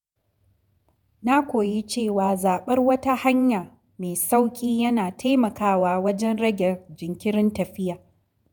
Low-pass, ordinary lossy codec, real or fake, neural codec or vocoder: none; none; fake; vocoder, 48 kHz, 128 mel bands, Vocos